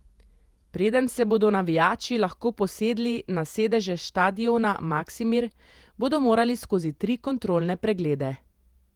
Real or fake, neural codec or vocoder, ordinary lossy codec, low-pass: fake; vocoder, 48 kHz, 128 mel bands, Vocos; Opus, 24 kbps; 19.8 kHz